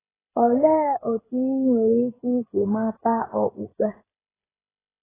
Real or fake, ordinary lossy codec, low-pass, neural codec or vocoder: fake; AAC, 16 kbps; 3.6 kHz; codec, 16 kHz, 16 kbps, FreqCodec, smaller model